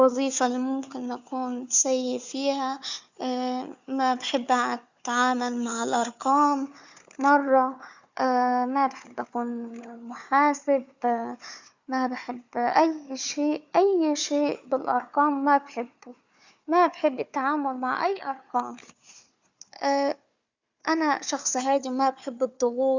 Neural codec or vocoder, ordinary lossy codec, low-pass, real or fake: codec, 16 kHz, 4 kbps, FunCodec, trained on Chinese and English, 50 frames a second; Opus, 64 kbps; 7.2 kHz; fake